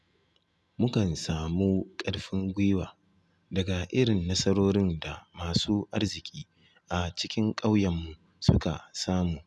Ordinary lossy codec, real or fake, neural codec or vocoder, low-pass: none; real; none; none